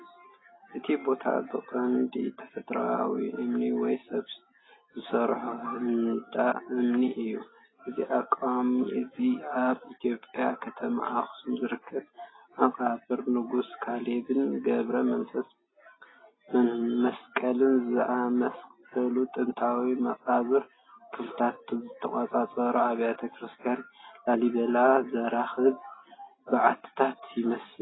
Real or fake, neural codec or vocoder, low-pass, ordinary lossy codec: real; none; 7.2 kHz; AAC, 16 kbps